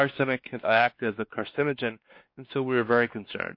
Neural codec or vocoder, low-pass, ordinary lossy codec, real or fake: codec, 16 kHz, 2 kbps, X-Codec, WavLM features, trained on Multilingual LibriSpeech; 5.4 kHz; MP3, 32 kbps; fake